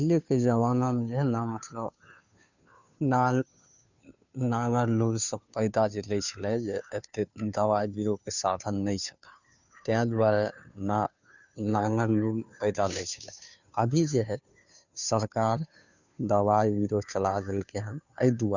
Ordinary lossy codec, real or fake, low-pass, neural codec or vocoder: none; fake; 7.2 kHz; codec, 16 kHz, 2 kbps, FunCodec, trained on Chinese and English, 25 frames a second